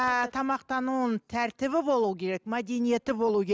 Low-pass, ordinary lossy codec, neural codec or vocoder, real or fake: none; none; none; real